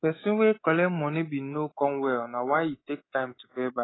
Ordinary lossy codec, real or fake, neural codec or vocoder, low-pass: AAC, 16 kbps; fake; codec, 24 kHz, 3.1 kbps, DualCodec; 7.2 kHz